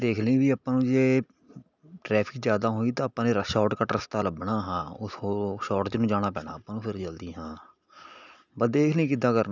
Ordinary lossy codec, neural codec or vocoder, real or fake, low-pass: none; none; real; 7.2 kHz